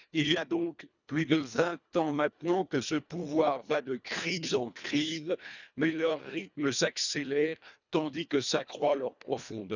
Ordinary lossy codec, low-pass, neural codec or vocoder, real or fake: none; 7.2 kHz; codec, 24 kHz, 1.5 kbps, HILCodec; fake